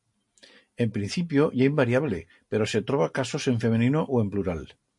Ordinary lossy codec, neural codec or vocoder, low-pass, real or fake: MP3, 48 kbps; none; 10.8 kHz; real